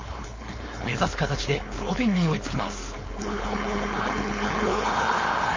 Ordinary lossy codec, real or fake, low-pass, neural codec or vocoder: MP3, 32 kbps; fake; 7.2 kHz; codec, 16 kHz, 4.8 kbps, FACodec